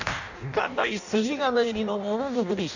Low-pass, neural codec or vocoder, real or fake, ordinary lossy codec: 7.2 kHz; codec, 16 kHz in and 24 kHz out, 0.6 kbps, FireRedTTS-2 codec; fake; none